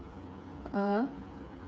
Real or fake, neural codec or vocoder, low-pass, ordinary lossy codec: fake; codec, 16 kHz, 8 kbps, FreqCodec, smaller model; none; none